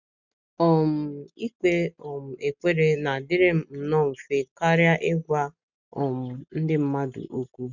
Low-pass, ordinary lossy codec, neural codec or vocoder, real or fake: 7.2 kHz; none; none; real